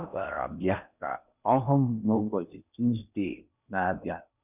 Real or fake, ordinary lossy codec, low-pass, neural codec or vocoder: fake; none; 3.6 kHz; codec, 16 kHz in and 24 kHz out, 0.6 kbps, FocalCodec, streaming, 4096 codes